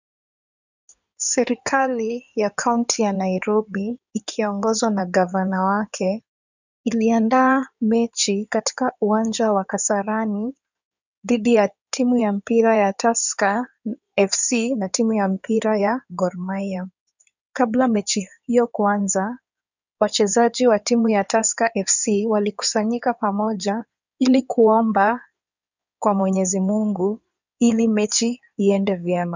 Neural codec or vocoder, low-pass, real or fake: codec, 16 kHz in and 24 kHz out, 2.2 kbps, FireRedTTS-2 codec; 7.2 kHz; fake